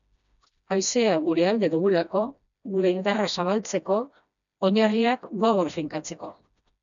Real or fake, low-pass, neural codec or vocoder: fake; 7.2 kHz; codec, 16 kHz, 1 kbps, FreqCodec, smaller model